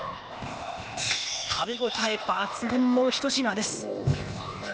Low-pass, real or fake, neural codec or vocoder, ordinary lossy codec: none; fake; codec, 16 kHz, 0.8 kbps, ZipCodec; none